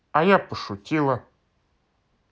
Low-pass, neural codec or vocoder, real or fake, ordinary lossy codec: none; none; real; none